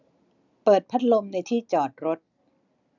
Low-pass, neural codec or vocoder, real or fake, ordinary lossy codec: 7.2 kHz; none; real; none